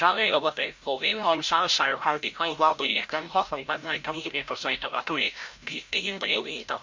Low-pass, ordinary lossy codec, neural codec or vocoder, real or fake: 7.2 kHz; MP3, 48 kbps; codec, 16 kHz, 0.5 kbps, FreqCodec, larger model; fake